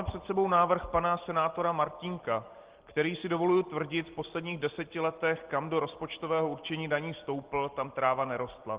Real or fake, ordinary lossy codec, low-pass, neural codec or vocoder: real; Opus, 16 kbps; 3.6 kHz; none